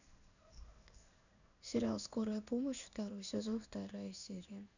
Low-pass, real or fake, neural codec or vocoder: 7.2 kHz; fake; codec, 16 kHz in and 24 kHz out, 1 kbps, XY-Tokenizer